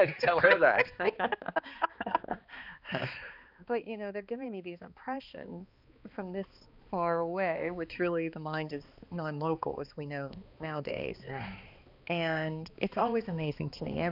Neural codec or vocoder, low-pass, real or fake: codec, 16 kHz, 2 kbps, X-Codec, HuBERT features, trained on balanced general audio; 5.4 kHz; fake